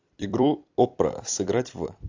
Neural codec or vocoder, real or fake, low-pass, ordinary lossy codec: vocoder, 44.1 kHz, 128 mel bands every 256 samples, BigVGAN v2; fake; 7.2 kHz; AAC, 48 kbps